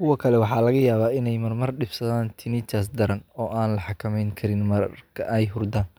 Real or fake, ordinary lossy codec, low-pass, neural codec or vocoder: real; none; none; none